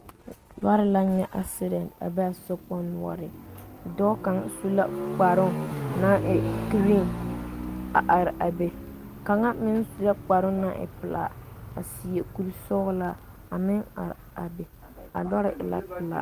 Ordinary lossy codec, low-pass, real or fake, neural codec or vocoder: Opus, 24 kbps; 14.4 kHz; real; none